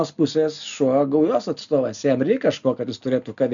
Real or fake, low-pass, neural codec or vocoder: real; 7.2 kHz; none